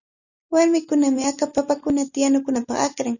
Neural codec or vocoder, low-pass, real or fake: none; 7.2 kHz; real